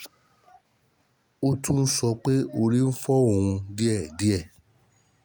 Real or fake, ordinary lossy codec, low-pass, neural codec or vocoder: real; none; none; none